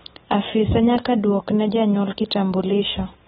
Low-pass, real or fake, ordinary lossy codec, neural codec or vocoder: 10.8 kHz; real; AAC, 16 kbps; none